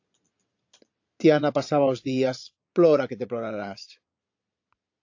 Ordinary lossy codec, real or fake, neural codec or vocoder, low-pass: AAC, 48 kbps; fake; vocoder, 22.05 kHz, 80 mel bands, Vocos; 7.2 kHz